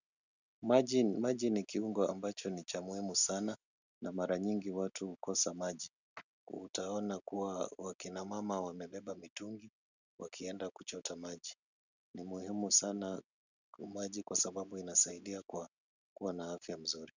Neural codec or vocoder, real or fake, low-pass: none; real; 7.2 kHz